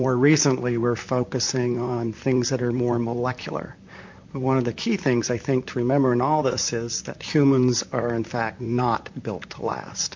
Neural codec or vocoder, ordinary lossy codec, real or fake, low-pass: vocoder, 44.1 kHz, 128 mel bands, Pupu-Vocoder; MP3, 48 kbps; fake; 7.2 kHz